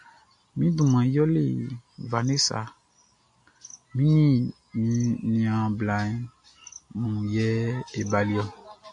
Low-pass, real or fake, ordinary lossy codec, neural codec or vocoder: 9.9 kHz; real; AAC, 64 kbps; none